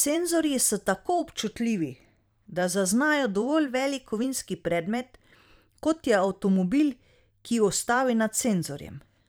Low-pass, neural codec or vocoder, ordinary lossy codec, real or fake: none; vocoder, 44.1 kHz, 128 mel bands every 512 samples, BigVGAN v2; none; fake